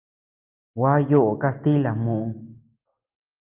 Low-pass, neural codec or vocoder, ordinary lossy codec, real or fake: 3.6 kHz; none; Opus, 24 kbps; real